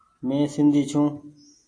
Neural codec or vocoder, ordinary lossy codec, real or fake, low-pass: none; AAC, 48 kbps; real; 9.9 kHz